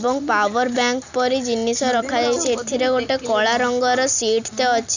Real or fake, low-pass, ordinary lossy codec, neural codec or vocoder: real; 7.2 kHz; none; none